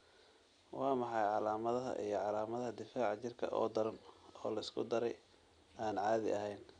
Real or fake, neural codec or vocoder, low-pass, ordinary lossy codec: real; none; 9.9 kHz; none